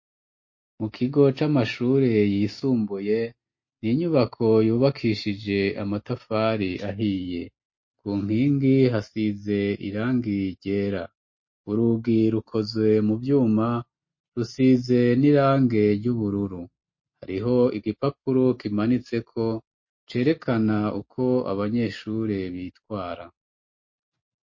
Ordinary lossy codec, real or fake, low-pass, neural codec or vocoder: MP3, 32 kbps; real; 7.2 kHz; none